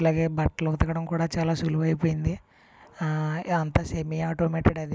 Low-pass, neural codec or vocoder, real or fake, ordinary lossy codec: none; none; real; none